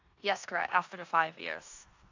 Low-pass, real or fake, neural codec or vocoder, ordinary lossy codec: 7.2 kHz; fake; codec, 16 kHz in and 24 kHz out, 0.9 kbps, LongCat-Audio-Codec, four codebook decoder; AAC, 48 kbps